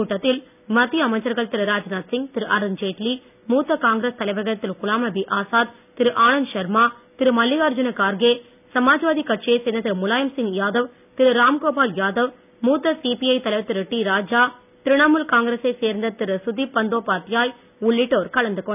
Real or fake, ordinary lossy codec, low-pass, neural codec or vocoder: real; none; 3.6 kHz; none